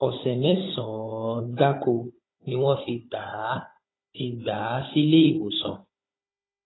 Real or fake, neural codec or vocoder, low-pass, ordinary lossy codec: fake; codec, 16 kHz, 16 kbps, FunCodec, trained on Chinese and English, 50 frames a second; 7.2 kHz; AAC, 16 kbps